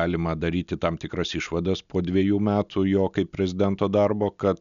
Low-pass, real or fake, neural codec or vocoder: 7.2 kHz; real; none